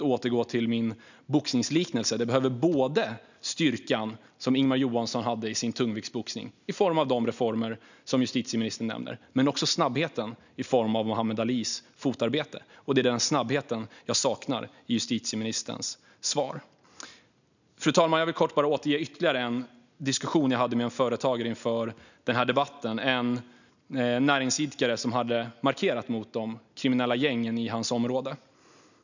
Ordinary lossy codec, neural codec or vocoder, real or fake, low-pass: none; none; real; 7.2 kHz